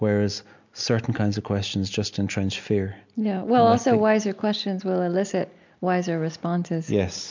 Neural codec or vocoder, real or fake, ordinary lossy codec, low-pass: none; real; MP3, 64 kbps; 7.2 kHz